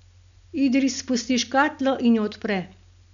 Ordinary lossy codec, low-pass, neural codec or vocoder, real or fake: none; 7.2 kHz; none; real